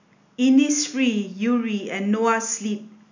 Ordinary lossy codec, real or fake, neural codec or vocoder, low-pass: none; real; none; 7.2 kHz